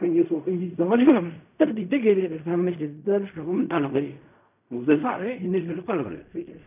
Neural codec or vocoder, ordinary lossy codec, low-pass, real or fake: codec, 16 kHz in and 24 kHz out, 0.4 kbps, LongCat-Audio-Codec, fine tuned four codebook decoder; none; 3.6 kHz; fake